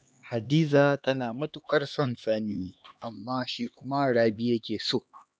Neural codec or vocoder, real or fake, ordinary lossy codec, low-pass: codec, 16 kHz, 2 kbps, X-Codec, HuBERT features, trained on LibriSpeech; fake; none; none